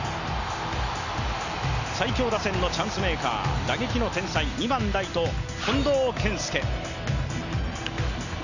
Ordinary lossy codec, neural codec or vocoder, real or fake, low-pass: none; none; real; 7.2 kHz